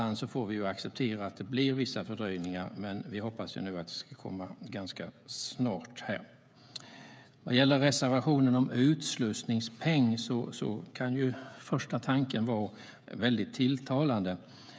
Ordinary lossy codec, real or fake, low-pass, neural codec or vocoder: none; fake; none; codec, 16 kHz, 16 kbps, FreqCodec, smaller model